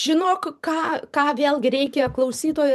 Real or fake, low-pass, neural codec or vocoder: fake; 14.4 kHz; vocoder, 44.1 kHz, 128 mel bands every 512 samples, BigVGAN v2